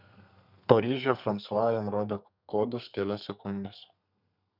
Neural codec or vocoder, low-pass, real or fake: codec, 32 kHz, 1.9 kbps, SNAC; 5.4 kHz; fake